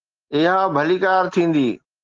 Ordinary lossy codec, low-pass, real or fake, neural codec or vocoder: Opus, 16 kbps; 7.2 kHz; real; none